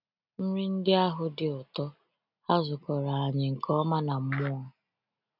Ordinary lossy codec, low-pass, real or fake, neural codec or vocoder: none; 5.4 kHz; real; none